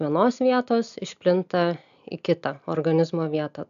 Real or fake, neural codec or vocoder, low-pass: real; none; 7.2 kHz